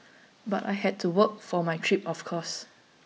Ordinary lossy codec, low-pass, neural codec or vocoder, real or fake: none; none; none; real